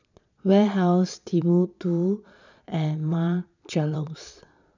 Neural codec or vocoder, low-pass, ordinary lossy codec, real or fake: vocoder, 22.05 kHz, 80 mel bands, Vocos; 7.2 kHz; none; fake